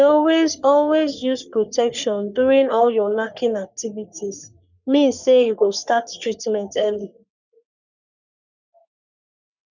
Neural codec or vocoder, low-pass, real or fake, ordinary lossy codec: codec, 44.1 kHz, 3.4 kbps, Pupu-Codec; 7.2 kHz; fake; none